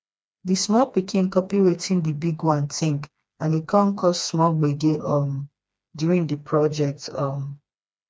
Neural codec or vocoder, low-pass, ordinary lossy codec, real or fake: codec, 16 kHz, 2 kbps, FreqCodec, smaller model; none; none; fake